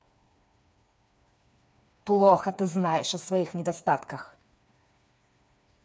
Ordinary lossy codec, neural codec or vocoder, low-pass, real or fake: none; codec, 16 kHz, 4 kbps, FreqCodec, smaller model; none; fake